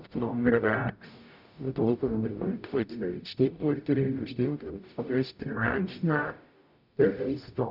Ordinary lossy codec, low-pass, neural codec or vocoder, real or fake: none; 5.4 kHz; codec, 44.1 kHz, 0.9 kbps, DAC; fake